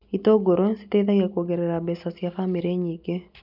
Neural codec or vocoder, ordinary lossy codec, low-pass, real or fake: none; none; 5.4 kHz; real